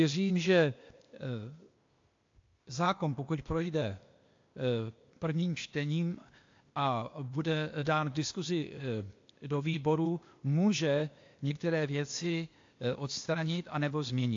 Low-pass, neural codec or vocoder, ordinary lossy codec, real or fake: 7.2 kHz; codec, 16 kHz, 0.8 kbps, ZipCodec; AAC, 48 kbps; fake